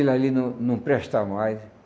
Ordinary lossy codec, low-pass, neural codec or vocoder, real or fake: none; none; none; real